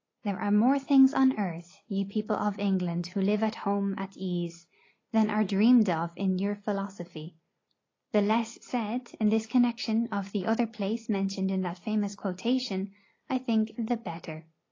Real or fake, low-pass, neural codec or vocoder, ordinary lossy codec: real; 7.2 kHz; none; AAC, 32 kbps